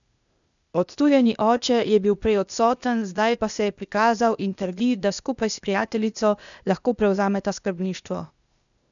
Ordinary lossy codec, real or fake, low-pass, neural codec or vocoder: none; fake; 7.2 kHz; codec, 16 kHz, 0.8 kbps, ZipCodec